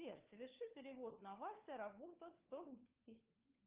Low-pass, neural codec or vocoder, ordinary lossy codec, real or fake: 3.6 kHz; codec, 16 kHz, 4 kbps, FunCodec, trained on LibriTTS, 50 frames a second; Opus, 32 kbps; fake